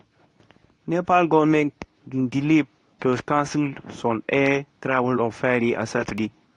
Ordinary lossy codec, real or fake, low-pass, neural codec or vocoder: AAC, 48 kbps; fake; 10.8 kHz; codec, 24 kHz, 0.9 kbps, WavTokenizer, medium speech release version 2